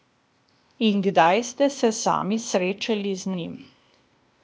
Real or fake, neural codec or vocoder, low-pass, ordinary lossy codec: fake; codec, 16 kHz, 0.8 kbps, ZipCodec; none; none